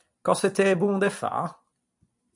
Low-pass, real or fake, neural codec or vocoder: 10.8 kHz; fake; vocoder, 44.1 kHz, 128 mel bands every 256 samples, BigVGAN v2